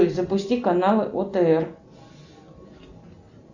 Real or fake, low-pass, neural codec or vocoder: real; 7.2 kHz; none